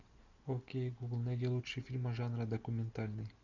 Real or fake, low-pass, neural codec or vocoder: real; 7.2 kHz; none